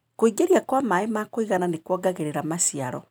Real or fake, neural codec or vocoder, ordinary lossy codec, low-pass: real; none; none; none